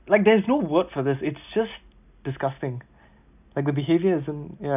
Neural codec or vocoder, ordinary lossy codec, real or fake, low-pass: none; none; real; 3.6 kHz